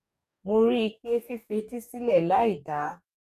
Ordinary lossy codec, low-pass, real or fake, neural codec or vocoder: none; 14.4 kHz; fake; codec, 44.1 kHz, 2.6 kbps, DAC